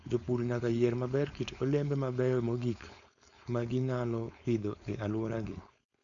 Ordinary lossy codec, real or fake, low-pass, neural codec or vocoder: none; fake; 7.2 kHz; codec, 16 kHz, 4.8 kbps, FACodec